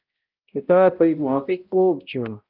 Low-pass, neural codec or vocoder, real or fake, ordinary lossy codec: 5.4 kHz; codec, 16 kHz, 0.5 kbps, X-Codec, HuBERT features, trained on balanced general audio; fake; Opus, 32 kbps